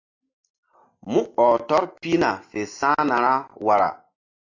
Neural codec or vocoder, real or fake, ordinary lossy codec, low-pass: none; real; AAC, 48 kbps; 7.2 kHz